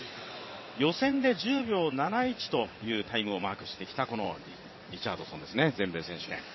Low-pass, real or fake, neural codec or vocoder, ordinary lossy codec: 7.2 kHz; fake; vocoder, 22.05 kHz, 80 mel bands, WaveNeXt; MP3, 24 kbps